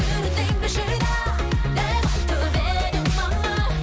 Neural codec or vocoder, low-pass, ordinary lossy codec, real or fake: none; none; none; real